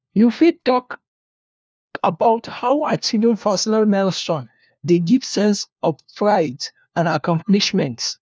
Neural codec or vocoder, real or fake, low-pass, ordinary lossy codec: codec, 16 kHz, 1 kbps, FunCodec, trained on LibriTTS, 50 frames a second; fake; none; none